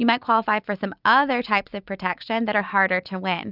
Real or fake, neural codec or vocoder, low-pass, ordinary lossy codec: real; none; 5.4 kHz; Opus, 64 kbps